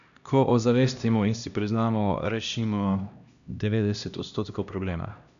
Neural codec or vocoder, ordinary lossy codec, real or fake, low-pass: codec, 16 kHz, 1 kbps, X-Codec, HuBERT features, trained on LibriSpeech; none; fake; 7.2 kHz